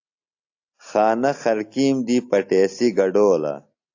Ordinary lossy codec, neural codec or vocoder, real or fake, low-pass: AAC, 48 kbps; none; real; 7.2 kHz